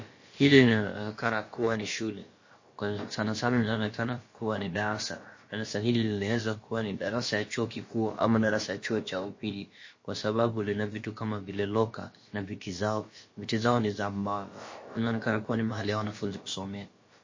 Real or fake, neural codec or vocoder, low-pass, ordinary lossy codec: fake; codec, 16 kHz, about 1 kbps, DyCAST, with the encoder's durations; 7.2 kHz; MP3, 32 kbps